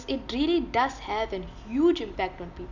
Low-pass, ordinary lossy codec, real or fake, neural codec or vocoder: 7.2 kHz; none; real; none